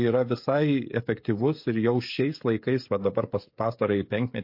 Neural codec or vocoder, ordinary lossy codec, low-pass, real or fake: codec, 16 kHz, 16 kbps, FreqCodec, smaller model; MP3, 32 kbps; 5.4 kHz; fake